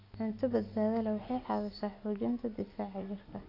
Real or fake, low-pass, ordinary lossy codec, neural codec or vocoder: real; 5.4 kHz; AAC, 32 kbps; none